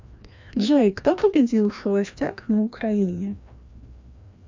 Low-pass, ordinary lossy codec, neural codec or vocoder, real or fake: 7.2 kHz; MP3, 64 kbps; codec, 16 kHz, 1 kbps, FreqCodec, larger model; fake